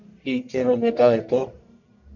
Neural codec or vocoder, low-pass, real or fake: codec, 44.1 kHz, 1.7 kbps, Pupu-Codec; 7.2 kHz; fake